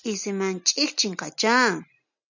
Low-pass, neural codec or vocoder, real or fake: 7.2 kHz; none; real